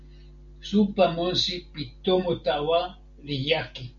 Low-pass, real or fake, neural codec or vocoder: 7.2 kHz; real; none